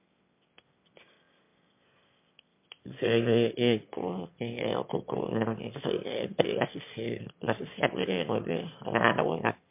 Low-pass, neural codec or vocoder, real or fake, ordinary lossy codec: 3.6 kHz; autoencoder, 22.05 kHz, a latent of 192 numbers a frame, VITS, trained on one speaker; fake; MP3, 32 kbps